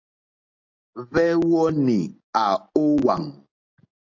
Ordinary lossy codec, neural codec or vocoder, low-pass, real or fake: AAC, 48 kbps; none; 7.2 kHz; real